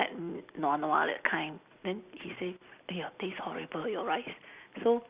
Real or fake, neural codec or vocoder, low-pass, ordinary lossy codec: real; none; 3.6 kHz; Opus, 64 kbps